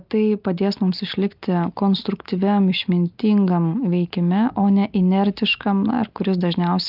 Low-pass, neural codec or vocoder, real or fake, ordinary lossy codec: 5.4 kHz; none; real; Opus, 24 kbps